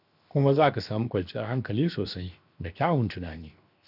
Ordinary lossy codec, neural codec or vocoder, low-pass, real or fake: none; codec, 16 kHz, 0.7 kbps, FocalCodec; 5.4 kHz; fake